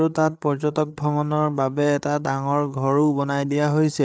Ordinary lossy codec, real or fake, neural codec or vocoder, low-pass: none; fake; codec, 16 kHz, 8 kbps, FreqCodec, larger model; none